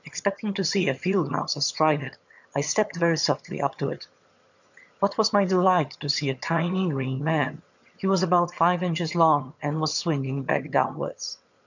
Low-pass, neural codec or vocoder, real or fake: 7.2 kHz; vocoder, 22.05 kHz, 80 mel bands, HiFi-GAN; fake